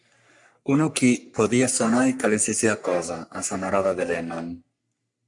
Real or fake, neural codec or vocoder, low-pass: fake; codec, 44.1 kHz, 3.4 kbps, Pupu-Codec; 10.8 kHz